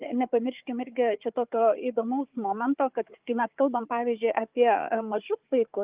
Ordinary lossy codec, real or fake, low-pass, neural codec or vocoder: Opus, 32 kbps; fake; 3.6 kHz; codec, 16 kHz, 4 kbps, FunCodec, trained on Chinese and English, 50 frames a second